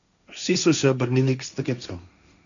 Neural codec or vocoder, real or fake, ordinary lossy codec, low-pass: codec, 16 kHz, 1.1 kbps, Voila-Tokenizer; fake; none; 7.2 kHz